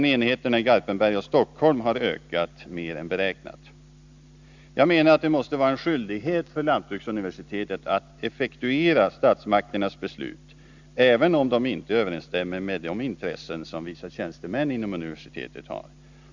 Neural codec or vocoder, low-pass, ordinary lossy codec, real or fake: none; 7.2 kHz; Opus, 64 kbps; real